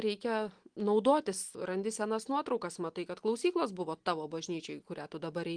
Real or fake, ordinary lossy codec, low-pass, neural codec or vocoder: real; Opus, 24 kbps; 9.9 kHz; none